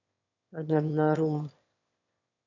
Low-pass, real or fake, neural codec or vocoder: 7.2 kHz; fake; autoencoder, 22.05 kHz, a latent of 192 numbers a frame, VITS, trained on one speaker